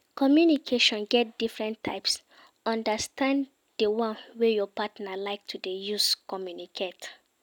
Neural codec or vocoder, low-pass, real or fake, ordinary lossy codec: none; 19.8 kHz; real; none